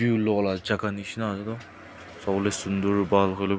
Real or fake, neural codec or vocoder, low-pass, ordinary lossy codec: real; none; none; none